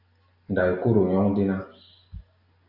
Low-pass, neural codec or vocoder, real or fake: 5.4 kHz; none; real